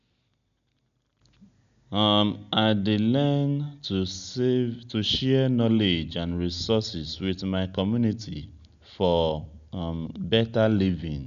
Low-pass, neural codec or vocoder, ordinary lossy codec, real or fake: 7.2 kHz; none; none; real